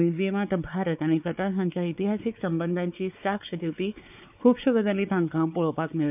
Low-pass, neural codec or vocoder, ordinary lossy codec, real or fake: 3.6 kHz; codec, 16 kHz, 4 kbps, X-Codec, WavLM features, trained on Multilingual LibriSpeech; none; fake